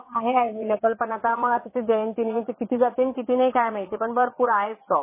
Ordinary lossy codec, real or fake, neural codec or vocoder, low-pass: MP3, 16 kbps; fake; vocoder, 22.05 kHz, 80 mel bands, Vocos; 3.6 kHz